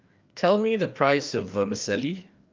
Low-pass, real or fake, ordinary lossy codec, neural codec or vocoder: 7.2 kHz; fake; Opus, 24 kbps; codec, 16 kHz, 2 kbps, FreqCodec, larger model